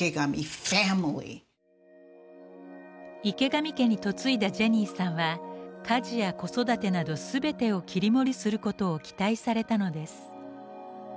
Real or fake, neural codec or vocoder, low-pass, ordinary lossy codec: real; none; none; none